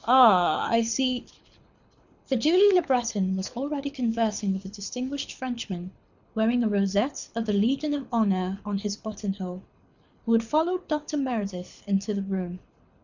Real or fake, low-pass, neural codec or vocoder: fake; 7.2 kHz; codec, 24 kHz, 6 kbps, HILCodec